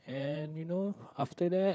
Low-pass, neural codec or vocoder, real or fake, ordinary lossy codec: none; codec, 16 kHz, 4 kbps, FreqCodec, larger model; fake; none